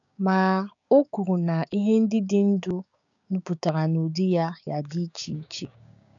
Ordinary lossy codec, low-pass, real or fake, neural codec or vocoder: none; 7.2 kHz; fake; codec, 16 kHz, 6 kbps, DAC